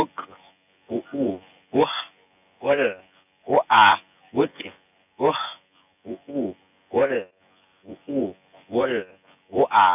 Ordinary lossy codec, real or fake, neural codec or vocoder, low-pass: none; fake; vocoder, 24 kHz, 100 mel bands, Vocos; 3.6 kHz